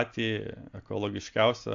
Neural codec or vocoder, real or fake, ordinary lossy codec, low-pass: none; real; AAC, 64 kbps; 7.2 kHz